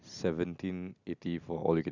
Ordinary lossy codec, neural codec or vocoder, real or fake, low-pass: none; none; real; 7.2 kHz